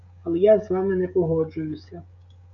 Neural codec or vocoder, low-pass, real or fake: codec, 16 kHz, 16 kbps, FreqCodec, smaller model; 7.2 kHz; fake